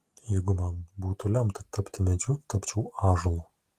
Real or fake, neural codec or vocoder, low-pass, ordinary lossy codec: real; none; 14.4 kHz; Opus, 24 kbps